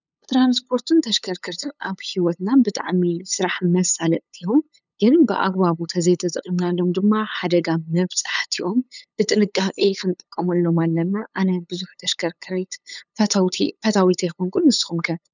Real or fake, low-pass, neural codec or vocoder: fake; 7.2 kHz; codec, 16 kHz, 8 kbps, FunCodec, trained on LibriTTS, 25 frames a second